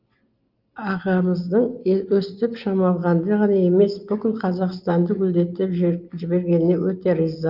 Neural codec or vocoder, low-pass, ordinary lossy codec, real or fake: none; 5.4 kHz; Opus, 32 kbps; real